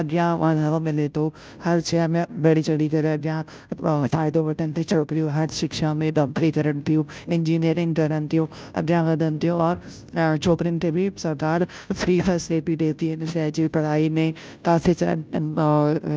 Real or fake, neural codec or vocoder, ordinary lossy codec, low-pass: fake; codec, 16 kHz, 0.5 kbps, FunCodec, trained on Chinese and English, 25 frames a second; none; none